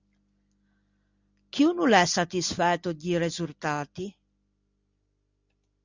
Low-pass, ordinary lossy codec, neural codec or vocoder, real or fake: 7.2 kHz; Opus, 64 kbps; none; real